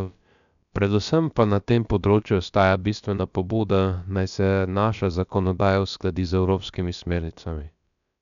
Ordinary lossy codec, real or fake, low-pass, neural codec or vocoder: none; fake; 7.2 kHz; codec, 16 kHz, about 1 kbps, DyCAST, with the encoder's durations